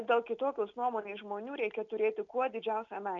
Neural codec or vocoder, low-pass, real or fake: none; 7.2 kHz; real